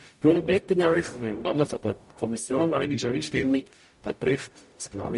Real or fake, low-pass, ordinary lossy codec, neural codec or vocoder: fake; 14.4 kHz; MP3, 48 kbps; codec, 44.1 kHz, 0.9 kbps, DAC